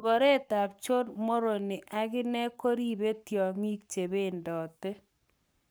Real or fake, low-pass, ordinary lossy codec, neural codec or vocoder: fake; none; none; codec, 44.1 kHz, 7.8 kbps, Pupu-Codec